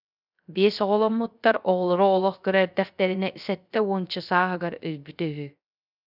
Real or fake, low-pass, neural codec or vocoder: fake; 5.4 kHz; codec, 16 kHz, 0.3 kbps, FocalCodec